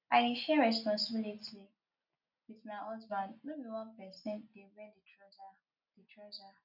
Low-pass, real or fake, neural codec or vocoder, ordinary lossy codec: 5.4 kHz; real; none; none